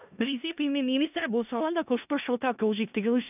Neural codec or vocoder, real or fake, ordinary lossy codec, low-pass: codec, 16 kHz in and 24 kHz out, 0.4 kbps, LongCat-Audio-Codec, four codebook decoder; fake; none; 3.6 kHz